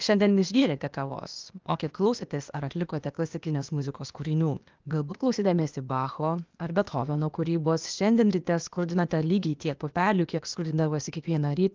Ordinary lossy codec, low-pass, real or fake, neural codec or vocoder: Opus, 24 kbps; 7.2 kHz; fake; codec, 16 kHz, 0.8 kbps, ZipCodec